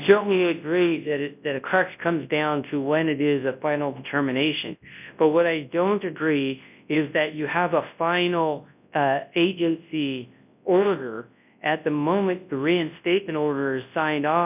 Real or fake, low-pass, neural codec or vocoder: fake; 3.6 kHz; codec, 24 kHz, 0.9 kbps, WavTokenizer, large speech release